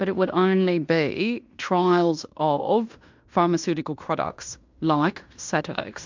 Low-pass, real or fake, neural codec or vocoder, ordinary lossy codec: 7.2 kHz; fake; codec, 16 kHz in and 24 kHz out, 0.9 kbps, LongCat-Audio-Codec, fine tuned four codebook decoder; MP3, 64 kbps